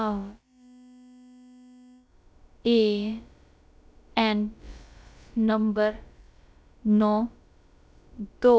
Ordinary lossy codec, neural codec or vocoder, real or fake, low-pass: none; codec, 16 kHz, about 1 kbps, DyCAST, with the encoder's durations; fake; none